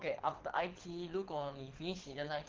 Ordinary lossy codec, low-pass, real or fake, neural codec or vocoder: Opus, 16 kbps; 7.2 kHz; fake; codec, 24 kHz, 6 kbps, HILCodec